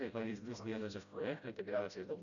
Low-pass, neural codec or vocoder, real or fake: 7.2 kHz; codec, 16 kHz, 0.5 kbps, FreqCodec, smaller model; fake